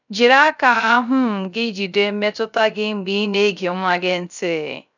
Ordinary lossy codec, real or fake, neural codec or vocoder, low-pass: none; fake; codec, 16 kHz, 0.3 kbps, FocalCodec; 7.2 kHz